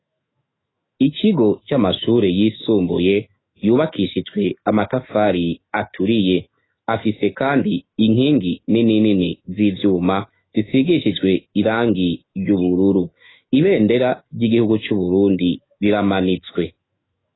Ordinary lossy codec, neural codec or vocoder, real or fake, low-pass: AAC, 16 kbps; autoencoder, 48 kHz, 128 numbers a frame, DAC-VAE, trained on Japanese speech; fake; 7.2 kHz